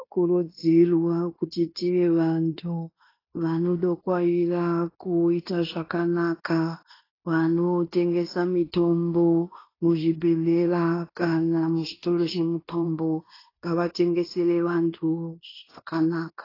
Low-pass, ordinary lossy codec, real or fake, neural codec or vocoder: 5.4 kHz; AAC, 24 kbps; fake; codec, 16 kHz in and 24 kHz out, 0.9 kbps, LongCat-Audio-Codec, fine tuned four codebook decoder